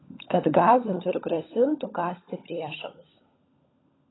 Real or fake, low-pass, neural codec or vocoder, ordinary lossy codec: fake; 7.2 kHz; codec, 16 kHz, 16 kbps, FunCodec, trained on LibriTTS, 50 frames a second; AAC, 16 kbps